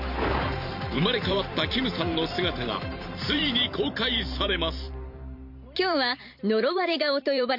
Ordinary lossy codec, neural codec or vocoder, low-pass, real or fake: none; vocoder, 44.1 kHz, 128 mel bands every 512 samples, BigVGAN v2; 5.4 kHz; fake